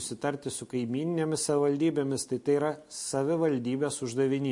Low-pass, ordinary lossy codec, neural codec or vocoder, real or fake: 10.8 kHz; MP3, 48 kbps; none; real